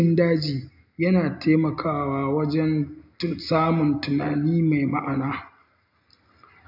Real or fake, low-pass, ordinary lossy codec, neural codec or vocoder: real; 5.4 kHz; none; none